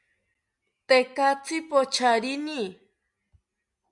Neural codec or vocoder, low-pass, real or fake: none; 10.8 kHz; real